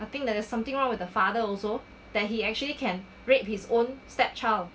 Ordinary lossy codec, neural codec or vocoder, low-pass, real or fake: none; none; none; real